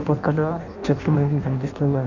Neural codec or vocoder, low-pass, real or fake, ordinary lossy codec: codec, 16 kHz in and 24 kHz out, 0.6 kbps, FireRedTTS-2 codec; 7.2 kHz; fake; none